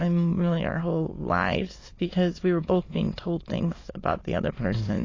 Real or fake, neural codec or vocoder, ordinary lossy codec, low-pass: fake; autoencoder, 22.05 kHz, a latent of 192 numbers a frame, VITS, trained on many speakers; AAC, 32 kbps; 7.2 kHz